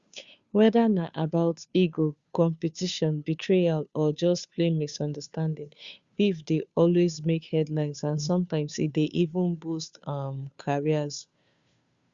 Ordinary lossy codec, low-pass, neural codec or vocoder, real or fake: Opus, 64 kbps; 7.2 kHz; codec, 16 kHz, 2 kbps, FunCodec, trained on Chinese and English, 25 frames a second; fake